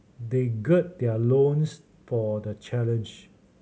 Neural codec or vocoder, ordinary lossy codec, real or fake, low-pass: none; none; real; none